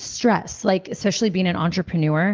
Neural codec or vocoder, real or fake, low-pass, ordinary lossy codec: none; real; 7.2 kHz; Opus, 16 kbps